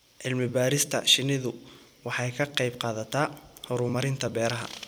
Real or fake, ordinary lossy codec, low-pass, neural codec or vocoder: fake; none; none; vocoder, 44.1 kHz, 128 mel bands every 256 samples, BigVGAN v2